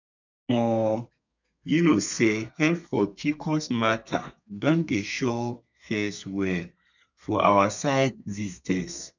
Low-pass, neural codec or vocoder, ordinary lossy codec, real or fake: 7.2 kHz; codec, 32 kHz, 1.9 kbps, SNAC; none; fake